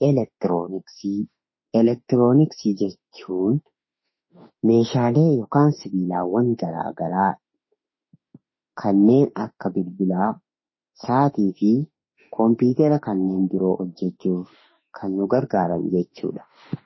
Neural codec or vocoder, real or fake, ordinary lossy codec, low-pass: autoencoder, 48 kHz, 32 numbers a frame, DAC-VAE, trained on Japanese speech; fake; MP3, 24 kbps; 7.2 kHz